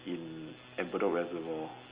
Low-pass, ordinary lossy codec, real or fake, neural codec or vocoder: 3.6 kHz; Opus, 32 kbps; real; none